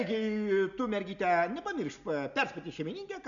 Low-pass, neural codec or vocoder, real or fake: 7.2 kHz; none; real